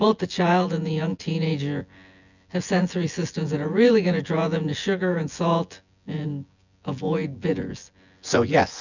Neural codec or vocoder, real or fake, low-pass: vocoder, 24 kHz, 100 mel bands, Vocos; fake; 7.2 kHz